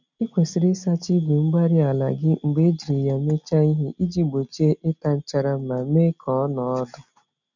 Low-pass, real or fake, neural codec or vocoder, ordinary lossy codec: 7.2 kHz; real; none; none